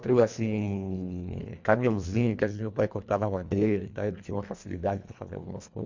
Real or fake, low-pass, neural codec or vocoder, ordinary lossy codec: fake; 7.2 kHz; codec, 24 kHz, 1.5 kbps, HILCodec; MP3, 64 kbps